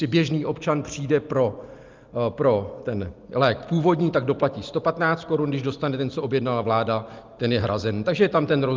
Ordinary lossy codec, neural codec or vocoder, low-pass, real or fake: Opus, 32 kbps; none; 7.2 kHz; real